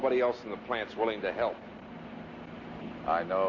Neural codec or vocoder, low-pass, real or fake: none; 7.2 kHz; real